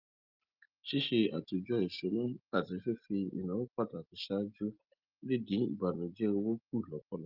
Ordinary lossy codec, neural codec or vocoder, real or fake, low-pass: Opus, 24 kbps; none; real; 5.4 kHz